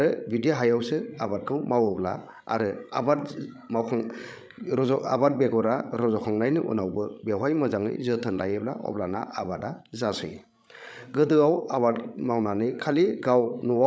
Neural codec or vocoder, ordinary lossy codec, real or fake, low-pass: codec, 16 kHz, 16 kbps, FreqCodec, larger model; none; fake; none